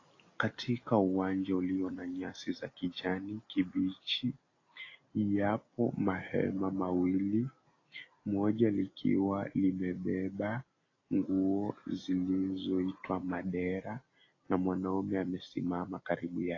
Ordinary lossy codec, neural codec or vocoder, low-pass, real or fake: AAC, 32 kbps; none; 7.2 kHz; real